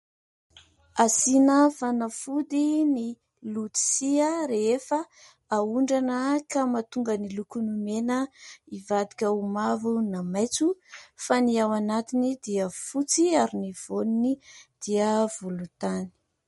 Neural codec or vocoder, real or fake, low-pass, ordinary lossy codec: none; real; 19.8 kHz; MP3, 48 kbps